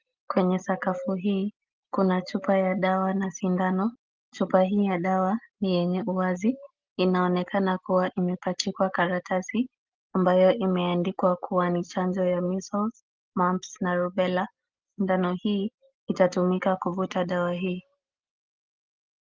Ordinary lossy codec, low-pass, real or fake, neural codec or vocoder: Opus, 32 kbps; 7.2 kHz; real; none